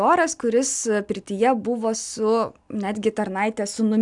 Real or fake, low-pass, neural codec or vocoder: real; 10.8 kHz; none